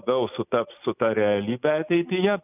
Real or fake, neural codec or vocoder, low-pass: real; none; 3.6 kHz